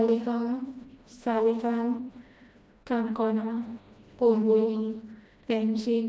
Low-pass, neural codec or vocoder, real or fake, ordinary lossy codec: none; codec, 16 kHz, 1 kbps, FreqCodec, smaller model; fake; none